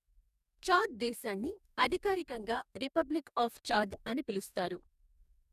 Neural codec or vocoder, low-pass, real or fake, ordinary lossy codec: codec, 44.1 kHz, 2.6 kbps, DAC; 14.4 kHz; fake; none